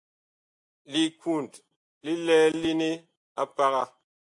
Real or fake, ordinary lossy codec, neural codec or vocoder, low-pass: real; AAC, 64 kbps; none; 10.8 kHz